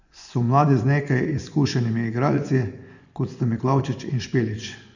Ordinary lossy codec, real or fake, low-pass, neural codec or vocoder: none; fake; 7.2 kHz; vocoder, 24 kHz, 100 mel bands, Vocos